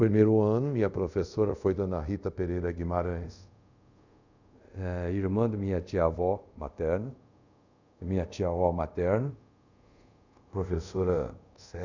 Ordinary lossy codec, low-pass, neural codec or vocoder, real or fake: none; 7.2 kHz; codec, 24 kHz, 0.5 kbps, DualCodec; fake